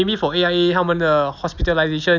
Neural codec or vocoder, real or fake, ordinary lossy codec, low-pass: none; real; none; 7.2 kHz